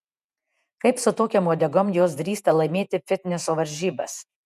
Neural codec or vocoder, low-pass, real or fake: vocoder, 48 kHz, 128 mel bands, Vocos; 14.4 kHz; fake